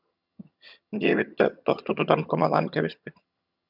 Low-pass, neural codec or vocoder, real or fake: 5.4 kHz; vocoder, 22.05 kHz, 80 mel bands, HiFi-GAN; fake